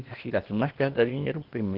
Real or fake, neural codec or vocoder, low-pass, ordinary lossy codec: fake; codec, 16 kHz, 0.8 kbps, ZipCodec; 5.4 kHz; Opus, 16 kbps